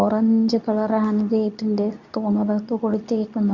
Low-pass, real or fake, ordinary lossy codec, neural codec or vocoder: 7.2 kHz; fake; none; codec, 24 kHz, 0.9 kbps, WavTokenizer, medium speech release version 2